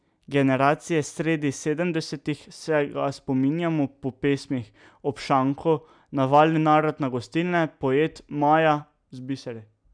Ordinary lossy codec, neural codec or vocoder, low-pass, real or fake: none; none; 9.9 kHz; real